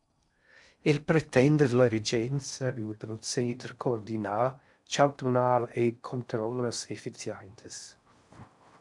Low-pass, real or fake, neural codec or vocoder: 10.8 kHz; fake; codec, 16 kHz in and 24 kHz out, 0.6 kbps, FocalCodec, streaming, 4096 codes